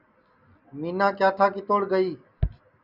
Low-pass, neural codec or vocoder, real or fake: 5.4 kHz; none; real